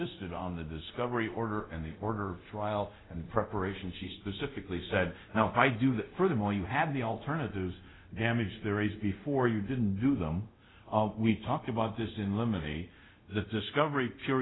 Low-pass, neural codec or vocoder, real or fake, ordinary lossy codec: 7.2 kHz; codec, 24 kHz, 0.5 kbps, DualCodec; fake; AAC, 16 kbps